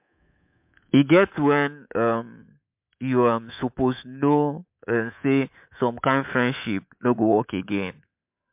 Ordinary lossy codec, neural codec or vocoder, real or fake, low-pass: MP3, 32 kbps; codec, 24 kHz, 3.1 kbps, DualCodec; fake; 3.6 kHz